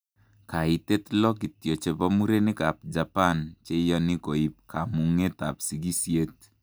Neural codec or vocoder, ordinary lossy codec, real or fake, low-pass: none; none; real; none